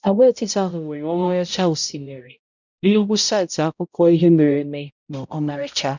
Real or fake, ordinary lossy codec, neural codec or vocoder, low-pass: fake; none; codec, 16 kHz, 0.5 kbps, X-Codec, HuBERT features, trained on balanced general audio; 7.2 kHz